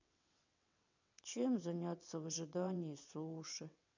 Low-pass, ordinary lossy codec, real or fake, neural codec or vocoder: 7.2 kHz; none; fake; vocoder, 22.05 kHz, 80 mel bands, WaveNeXt